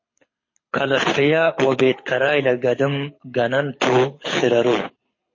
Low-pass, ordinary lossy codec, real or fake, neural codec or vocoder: 7.2 kHz; MP3, 32 kbps; fake; codec, 24 kHz, 6 kbps, HILCodec